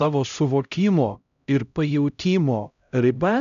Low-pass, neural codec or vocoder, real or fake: 7.2 kHz; codec, 16 kHz, 0.5 kbps, X-Codec, HuBERT features, trained on LibriSpeech; fake